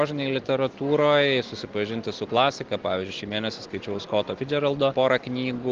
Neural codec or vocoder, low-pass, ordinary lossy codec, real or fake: none; 7.2 kHz; Opus, 16 kbps; real